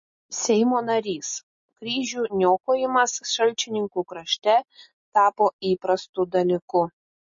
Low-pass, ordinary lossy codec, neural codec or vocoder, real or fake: 7.2 kHz; MP3, 32 kbps; none; real